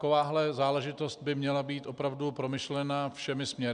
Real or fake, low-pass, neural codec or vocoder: real; 9.9 kHz; none